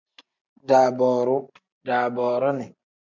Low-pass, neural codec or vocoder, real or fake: 7.2 kHz; none; real